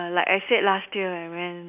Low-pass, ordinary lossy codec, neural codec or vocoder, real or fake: 3.6 kHz; none; none; real